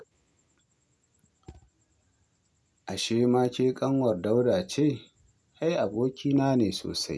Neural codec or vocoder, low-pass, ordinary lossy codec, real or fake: none; none; none; real